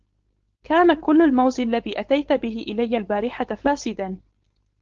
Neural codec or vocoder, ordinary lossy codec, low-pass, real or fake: codec, 16 kHz, 4.8 kbps, FACodec; Opus, 16 kbps; 7.2 kHz; fake